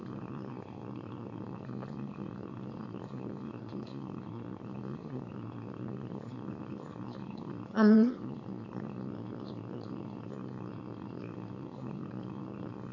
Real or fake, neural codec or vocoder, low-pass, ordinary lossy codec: fake; autoencoder, 22.05 kHz, a latent of 192 numbers a frame, VITS, trained on one speaker; 7.2 kHz; AAC, 48 kbps